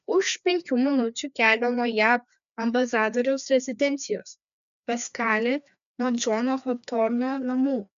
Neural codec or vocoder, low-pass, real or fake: codec, 16 kHz, 2 kbps, FreqCodec, larger model; 7.2 kHz; fake